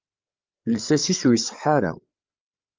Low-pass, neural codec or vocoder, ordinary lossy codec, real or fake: 7.2 kHz; codec, 16 kHz, 8 kbps, FreqCodec, larger model; Opus, 24 kbps; fake